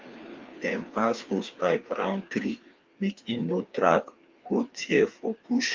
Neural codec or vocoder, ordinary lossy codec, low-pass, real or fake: codec, 16 kHz, 2 kbps, FreqCodec, larger model; Opus, 32 kbps; 7.2 kHz; fake